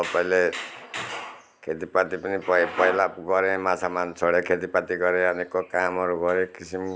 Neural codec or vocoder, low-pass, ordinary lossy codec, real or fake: none; none; none; real